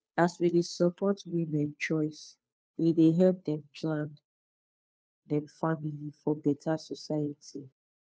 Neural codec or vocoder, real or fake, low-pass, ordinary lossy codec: codec, 16 kHz, 2 kbps, FunCodec, trained on Chinese and English, 25 frames a second; fake; none; none